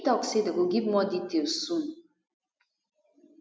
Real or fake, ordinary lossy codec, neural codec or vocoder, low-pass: real; none; none; none